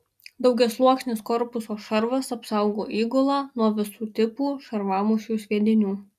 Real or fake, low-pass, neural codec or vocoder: real; 14.4 kHz; none